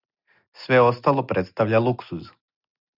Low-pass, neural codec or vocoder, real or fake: 5.4 kHz; none; real